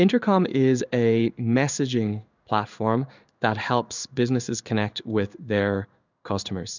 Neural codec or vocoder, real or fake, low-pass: codec, 16 kHz in and 24 kHz out, 1 kbps, XY-Tokenizer; fake; 7.2 kHz